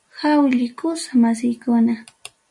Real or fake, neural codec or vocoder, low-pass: real; none; 10.8 kHz